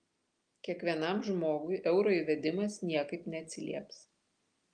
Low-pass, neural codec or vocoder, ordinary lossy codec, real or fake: 9.9 kHz; none; Opus, 32 kbps; real